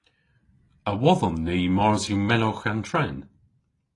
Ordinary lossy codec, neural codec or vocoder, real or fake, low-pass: AAC, 32 kbps; none; real; 10.8 kHz